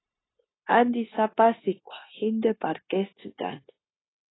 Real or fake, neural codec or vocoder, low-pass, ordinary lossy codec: fake; codec, 16 kHz, 0.9 kbps, LongCat-Audio-Codec; 7.2 kHz; AAC, 16 kbps